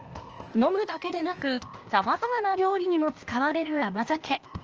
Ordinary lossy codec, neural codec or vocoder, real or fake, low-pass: Opus, 24 kbps; codec, 16 kHz, 0.8 kbps, ZipCodec; fake; 7.2 kHz